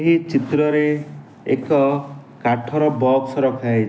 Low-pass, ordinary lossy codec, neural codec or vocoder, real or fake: none; none; none; real